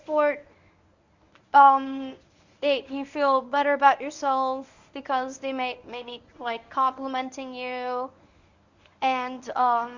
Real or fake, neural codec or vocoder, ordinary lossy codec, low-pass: fake; codec, 24 kHz, 0.9 kbps, WavTokenizer, medium speech release version 1; Opus, 64 kbps; 7.2 kHz